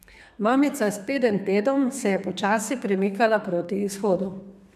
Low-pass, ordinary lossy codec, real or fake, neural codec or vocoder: 14.4 kHz; none; fake; codec, 32 kHz, 1.9 kbps, SNAC